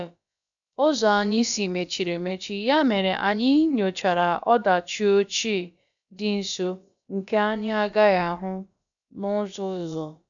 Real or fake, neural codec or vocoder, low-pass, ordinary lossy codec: fake; codec, 16 kHz, about 1 kbps, DyCAST, with the encoder's durations; 7.2 kHz; none